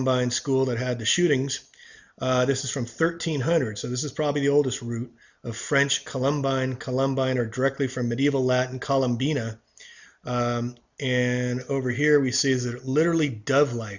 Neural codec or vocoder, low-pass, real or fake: none; 7.2 kHz; real